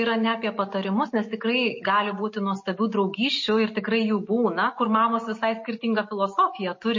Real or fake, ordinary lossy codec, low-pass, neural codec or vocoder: real; MP3, 32 kbps; 7.2 kHz; none